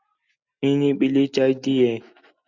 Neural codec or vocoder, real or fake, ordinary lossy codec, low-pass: none; real; Opus, 64 kbps; 7.2 kHz